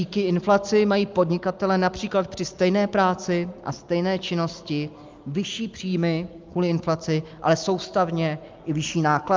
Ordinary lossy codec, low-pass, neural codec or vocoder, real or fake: Opus, 32 kbps; 7.2 kHz; none; real